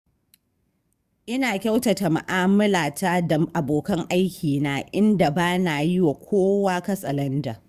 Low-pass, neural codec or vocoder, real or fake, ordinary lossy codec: 14.4 kHz; codec, 44.1 kHz, 7.8 kbps, DAC; fake; Opus, 64 kbps